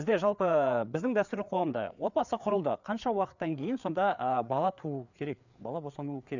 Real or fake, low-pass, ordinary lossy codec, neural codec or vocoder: fake; 7.2 kHz; none; codec, 16 kHz, 4 kbps, FreqCodec, larger model